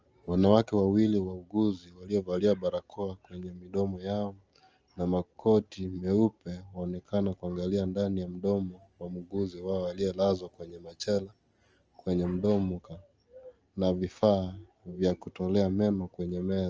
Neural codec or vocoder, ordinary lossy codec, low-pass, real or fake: none; Opus, 24 kbps; 7.2 kHz; real